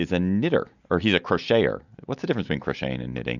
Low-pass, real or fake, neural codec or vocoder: 7.2 kHz; real; none